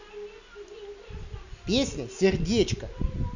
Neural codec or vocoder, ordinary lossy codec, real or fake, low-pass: none; none; real; 7.2 kHz